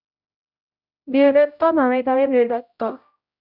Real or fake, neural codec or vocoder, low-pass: fake; codec, 16 kHz, 0.5 kbps, X-Codec, HuBERT features, trained on general audio; 5.4 kHz